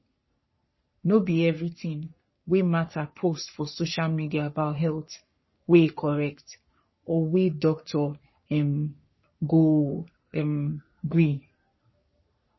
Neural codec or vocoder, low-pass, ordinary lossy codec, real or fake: codec, 44.1 kHz, 3.4 kbps, Pupu-Codec; 7.2 kHz; MP3, 24 kbps; fake